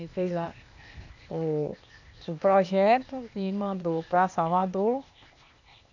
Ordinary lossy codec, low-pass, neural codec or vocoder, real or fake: none; 7.2 kHz; codec, 16 kHz, 0.8 kbps, ZipCodec; fake